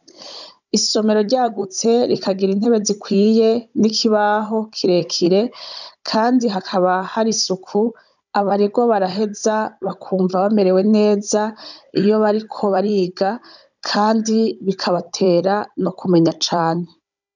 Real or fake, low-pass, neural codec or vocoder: fake; 7.2 kHz; codec, 16 kHz, 16 kbps, FunCodec, trained on Chinese and English, 50 frames a second